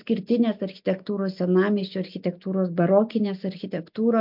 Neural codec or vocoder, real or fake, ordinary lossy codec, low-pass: none; real; MP3, 48 kbps; 5.4 kHz